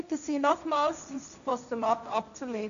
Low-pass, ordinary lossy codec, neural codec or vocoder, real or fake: 7.2 kHz; none; codec, 16 kHz, 1.1 kbps, Voila-Tokenizer; fake